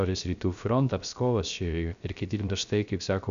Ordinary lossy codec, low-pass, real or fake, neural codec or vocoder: MP3, 96 kbps; 7.2 kHz; fake; codec, 16 kHz, 0.3 kbps, FocalCodec